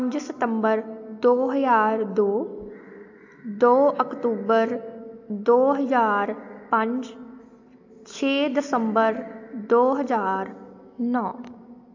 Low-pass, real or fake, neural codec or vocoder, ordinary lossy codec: 7.2 kHz; real; none; AAC, 48 kbps